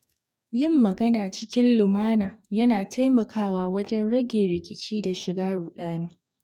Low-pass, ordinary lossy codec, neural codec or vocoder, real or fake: 19.8 kHz; none; codec, 44.1 kHz, 2.6 kbps, DAC; fake